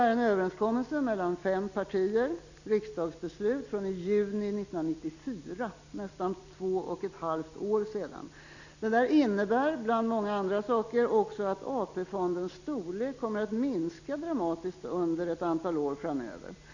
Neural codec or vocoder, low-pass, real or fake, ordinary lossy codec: none; 7.2 kHz; real; none